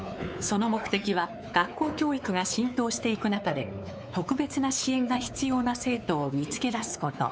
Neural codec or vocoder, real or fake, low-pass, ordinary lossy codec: codec, 16 kHz, 4 kbps, X-Codec, WavLM features, trained on Multilingual LibriSpeech; fake; none; none